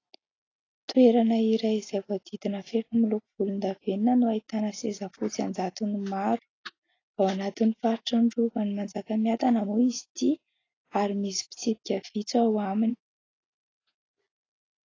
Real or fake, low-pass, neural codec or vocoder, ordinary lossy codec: real; 7.2 kHz; none; AAC, 32 kbps